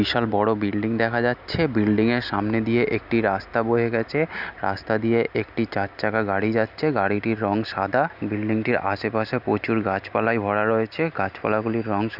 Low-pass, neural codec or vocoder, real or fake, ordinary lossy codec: 5.4 kHz; none; real; none